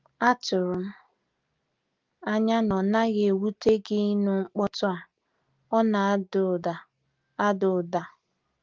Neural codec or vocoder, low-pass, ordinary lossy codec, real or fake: none; 7.2 kHz; Opus, 32 kbps; real